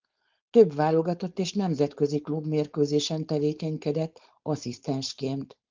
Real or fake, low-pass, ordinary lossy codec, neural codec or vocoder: fake; 7.2 kHz; Opus, 32 kbps; codec, 16 kHz, 4.8 kbps, FACodec